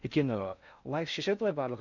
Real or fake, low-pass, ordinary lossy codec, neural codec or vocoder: fake; 7.2 kHz; none; codec, 16 kHz in and 24 kHz out, 0.6 kbps, FocalCodec, streaming, 4096 codes